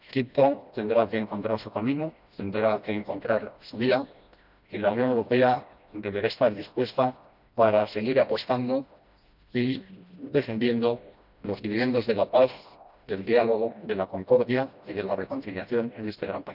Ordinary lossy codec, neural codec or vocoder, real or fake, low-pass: none; codec, 16 kHz, 1 kbps, FreqCodec, smaller model; fake; 5.4 kHz